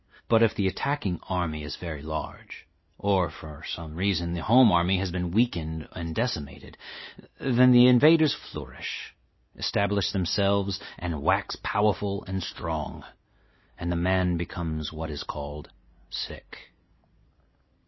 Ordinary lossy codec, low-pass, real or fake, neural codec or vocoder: MP3, 24 kbps; 7.2 kHz; real; none